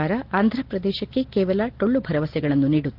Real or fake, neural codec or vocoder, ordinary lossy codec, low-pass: real; none; Opus, 16 kbps; 5.4 kHz